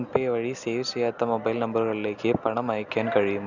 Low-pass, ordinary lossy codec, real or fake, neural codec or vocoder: 7.2 kHz; none; real; none